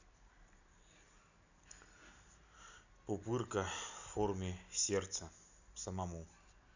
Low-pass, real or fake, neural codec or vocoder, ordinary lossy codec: 7.2 kHz; real; none; none